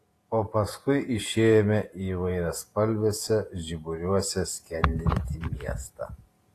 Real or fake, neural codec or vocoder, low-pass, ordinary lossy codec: fake; vocoder, 48 kHz, 128 mel bands, Vocos; 14.4 kHz; AAC, 48 kbps